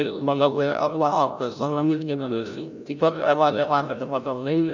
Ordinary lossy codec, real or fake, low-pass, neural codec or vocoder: none; fake; 7.2 kHz; codec, 16 kHz, 0.5 kbps, FreqCodec, larger model